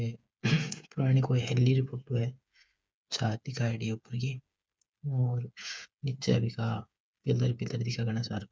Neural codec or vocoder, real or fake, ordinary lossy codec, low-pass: codec, 16 kHz, 8 kbps, FreqCodec, smaller model; fake; none; none